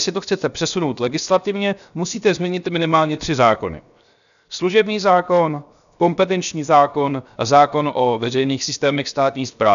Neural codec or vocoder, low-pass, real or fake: codec, 16 kHz, 0.7 kbps, FocalCodec; 7.2 kHz; fake